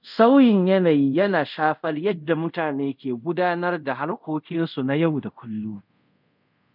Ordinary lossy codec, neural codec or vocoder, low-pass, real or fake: none; codec, 24 kHz, 0.5 kbps, DualCodec; 5.4 kHz; fake